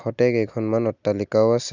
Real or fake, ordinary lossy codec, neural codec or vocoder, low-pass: real; none; none; 7.2 kHz